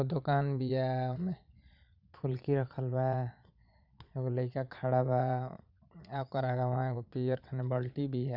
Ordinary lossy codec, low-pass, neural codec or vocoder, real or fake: none; 5.4 kHz; vocoder, 22.05 kHz, 80 mel bands, Vocos; fake